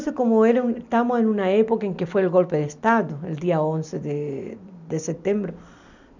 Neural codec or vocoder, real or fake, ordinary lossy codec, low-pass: none; real; none; 7.2 kHz